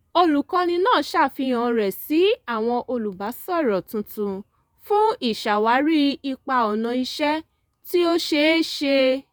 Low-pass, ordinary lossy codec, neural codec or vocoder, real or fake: none; none; vocoder, 48 kHz, 128 mel bands, Vocos; fake